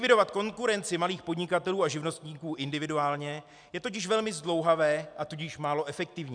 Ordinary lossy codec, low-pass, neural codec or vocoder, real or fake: MP3, 96 kbps; 9.9 kHz; none; real